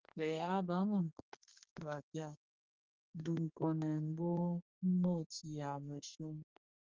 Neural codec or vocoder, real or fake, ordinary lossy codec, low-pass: codec, 32 kHz, 1.9 kbps, SNAC; fake; Opus, 32 kbps; 7.2 kHz